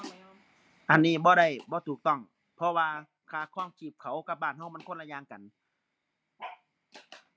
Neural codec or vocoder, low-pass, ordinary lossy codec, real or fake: none; none; none; real